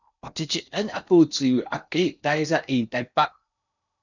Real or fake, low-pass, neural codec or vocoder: fake; 7.2 kHz; codec, 16 kHz in and 24 kHz out, 0.8 kbps, FocalCodec, streaming, 65536 codes